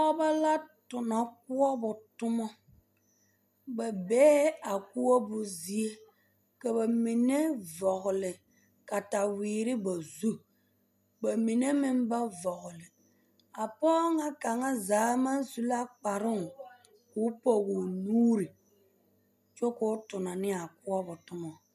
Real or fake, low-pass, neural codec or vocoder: real; 14.4 kHz; none